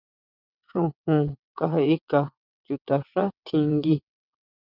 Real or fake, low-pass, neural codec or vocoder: fake; 5.4 kHz; vocoder, 22.05 kHz, 80 mel bands, WaveNeXt